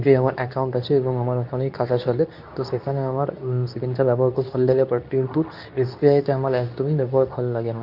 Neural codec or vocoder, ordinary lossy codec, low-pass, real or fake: codec, 24 kHz, 0.9 kbps, WavTokenizer, medium speech release version 2; none; 5.4 kHz; fake